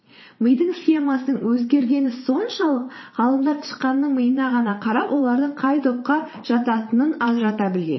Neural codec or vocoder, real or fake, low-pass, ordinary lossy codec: vocoder, 22.05 kHz, 80 mel bands, Vocos; fake; 7.2 kHz; MP3, 24 kbps